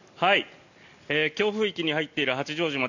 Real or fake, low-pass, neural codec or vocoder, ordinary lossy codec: real; 7.2 kHz; none; none